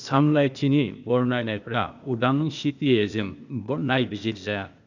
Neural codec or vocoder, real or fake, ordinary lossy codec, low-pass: codec, 16 kHz, 0.8 kbps, ZipCodec; fake; none; 7.2 kHz